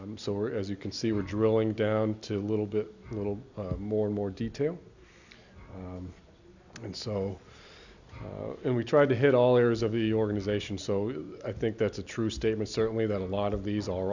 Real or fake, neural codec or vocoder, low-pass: real; none; 7.2 kHz